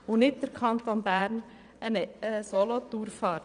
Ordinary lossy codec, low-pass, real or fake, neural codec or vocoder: none; 9.9 kHz; fake; vocoder, 22.05 kHz, 80 mel bands, Vocos